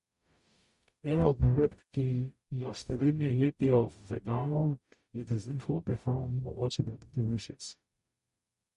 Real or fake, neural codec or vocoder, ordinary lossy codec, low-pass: fake; codec, 44.1 kHz, 0.9 kbps, DAC; MP3, 48 kbps; 14.4 kHz